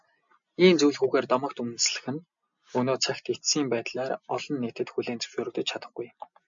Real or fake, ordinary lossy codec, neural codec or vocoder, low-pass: real; MP3, 64 kbps; none; 7.2 kHz